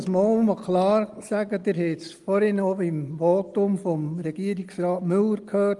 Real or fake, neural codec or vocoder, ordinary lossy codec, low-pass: real; none; Opus, 32 kbps; 10.8 kHz